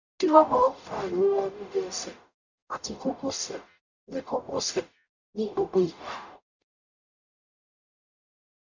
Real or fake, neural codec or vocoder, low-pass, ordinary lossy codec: fake; codec, 44.1 kHz, 0.9 kbps, DAC; 7.2 kHz; none